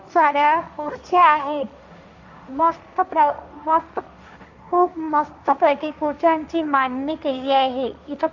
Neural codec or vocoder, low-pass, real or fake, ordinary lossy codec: codec, 16 kHz, 1.1 kbps, Voila-Tokenizer; 7.2 kHz; fake; none